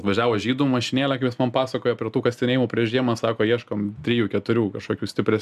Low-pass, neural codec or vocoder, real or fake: 14.4 kHz; none; real